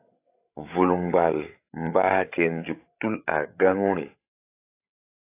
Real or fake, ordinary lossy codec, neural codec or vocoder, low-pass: fake; AAC, 24 kbps; codec, 16 kHz, 16 kbps, FreqCodec, larger model; 3.6 kHz